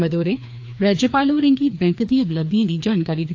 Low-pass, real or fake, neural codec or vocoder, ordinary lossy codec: 7.2 kHz; fake; codec, 16 kHz, 2 kbps, FreqCodec, larger model; AAC, 48 kbps